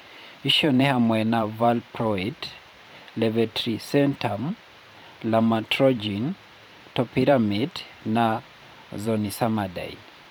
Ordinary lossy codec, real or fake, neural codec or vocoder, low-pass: none; fake; vocoder, 44.1 kHz, 128 mel bands every 512 samples, BigVGAN v2; none